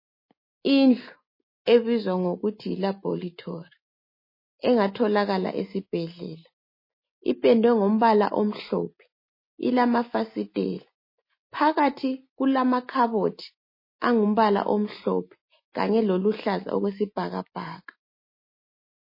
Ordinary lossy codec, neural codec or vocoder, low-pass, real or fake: MP3, 24 kbps; none; 5.4 kHz; real